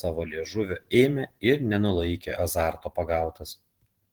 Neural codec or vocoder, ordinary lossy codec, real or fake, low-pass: vocoder, 44.1 kHz, 128 mel bands every 512 samples, BigVGAN v2; Opus, 16 kbps; fake; 19.8 kHz